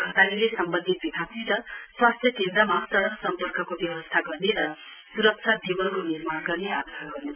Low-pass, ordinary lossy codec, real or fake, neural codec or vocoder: 3.6 kHz; none; real; none